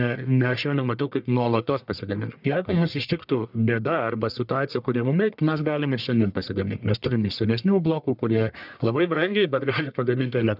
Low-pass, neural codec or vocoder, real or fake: 5.4 kHz; codec, 44.1 kHz, 1.7 kbps, Pupu-Codec; fake